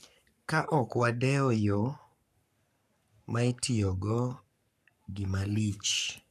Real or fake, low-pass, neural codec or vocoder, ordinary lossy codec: fake; 14.4 kHz; codec, 44.1 kHz, 7.8 kbps, Pupu-Codec; none